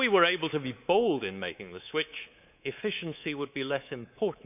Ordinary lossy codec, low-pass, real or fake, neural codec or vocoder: none; 3.6 kHz; fake; codec, 24 kHz, 3.1 kbps, DualCodec